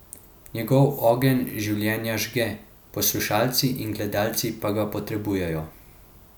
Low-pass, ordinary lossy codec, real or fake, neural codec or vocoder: none; none; real; none